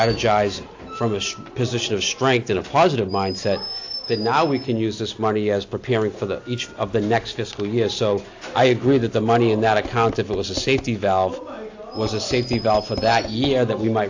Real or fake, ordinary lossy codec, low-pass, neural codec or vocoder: real; AAC, 48 kbps; 7.2 kHz; none